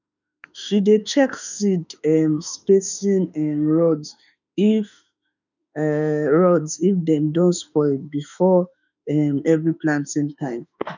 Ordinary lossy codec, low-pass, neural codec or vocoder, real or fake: none; 7.2 kHz; autoencoder, 48 kHz, 32 numbers a frame, DAC-VAE, trained on Japanese speech; fake